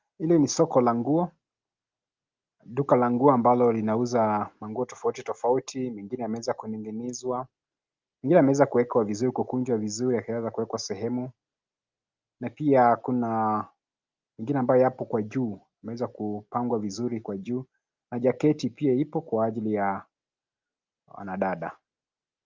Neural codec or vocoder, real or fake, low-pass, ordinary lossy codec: none; real; 7.2 kHz; Opus, 24 kbps